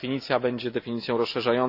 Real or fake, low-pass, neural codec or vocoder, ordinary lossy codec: real; 5.4 kHz; none; none